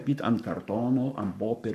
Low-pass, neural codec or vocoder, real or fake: 14.4 kHz; codec, 44.1 kHz, 7.8 kbps, Pupu-Codec; fake